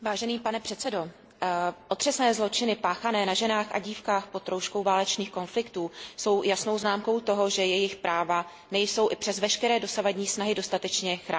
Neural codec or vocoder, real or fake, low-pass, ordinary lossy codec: none; real; none; none